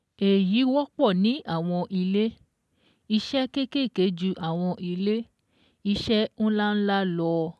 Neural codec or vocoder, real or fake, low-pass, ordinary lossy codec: none; real; none; none